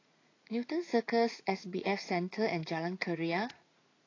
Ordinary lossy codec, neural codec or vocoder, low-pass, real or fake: AAC, 32 kbps; vocoder, 44.1 kHz, 80 mel bands, Vocos; 7.2 kHz; fake